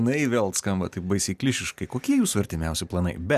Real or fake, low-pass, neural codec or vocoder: real; 14.4 kHz; none